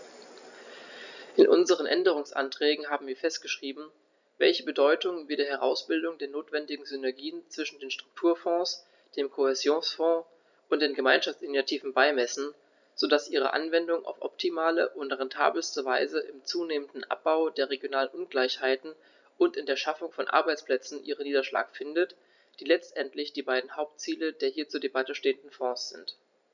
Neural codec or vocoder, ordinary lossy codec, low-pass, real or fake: none; none; 7.2 kHz; real